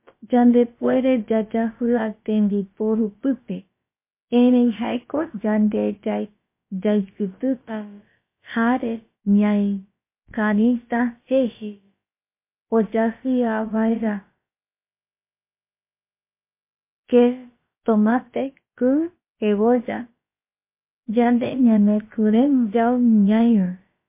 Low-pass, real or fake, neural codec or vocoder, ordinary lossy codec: 3.6 kHz; fake; codec, 16 kHz, about 1 kbps, DyCAST, with the encoder's durations; MP3, 24 kbps